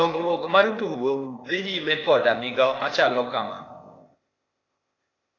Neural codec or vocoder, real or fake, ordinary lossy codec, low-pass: codec, 16 kHz, 0.8 kbps, ZipCodec; fake; AAC, 32 kbps; 7.2 kHz